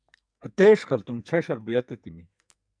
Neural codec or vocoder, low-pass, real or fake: codec, 44.1 kHz, 2.6 kbps, SNAC; 9.9 kHz; fake